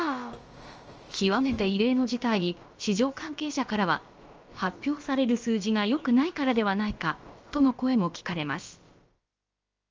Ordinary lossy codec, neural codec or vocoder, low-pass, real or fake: Opus, 24 kbps; codec, 16 kHz, about 1 kbps, DyCAST, with the encoder's durations; 7.2 kHz; fake